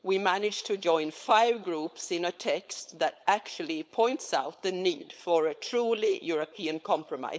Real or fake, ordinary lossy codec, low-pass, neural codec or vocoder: fake; none; none; codec, 16 kHz, 4.8 kbps, FACodec